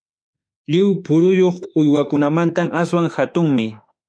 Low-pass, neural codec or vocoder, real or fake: 9.9 kHz; autoencoder, 48 kHz, 32 numbers a frame, DAC-VAE, trained on Japanese speech; fake